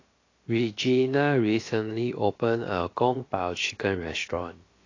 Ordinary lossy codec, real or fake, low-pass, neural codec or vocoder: AAC, 32 kbps; fake; 7.2 kHz; codec, 16 kHz, about 1 kbps, DyCAST, with the encoder's durations